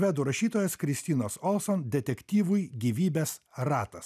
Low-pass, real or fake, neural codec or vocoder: 14.4 kHz; real; none